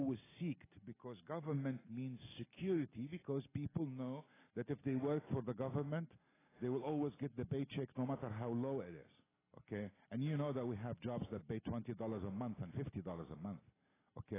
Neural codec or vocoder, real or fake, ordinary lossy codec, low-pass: none; real; AAC, 16 kbps; 3.6 kHz